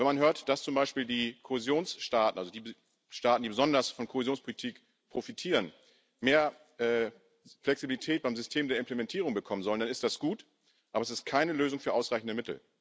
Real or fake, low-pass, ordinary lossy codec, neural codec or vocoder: real; none; none; none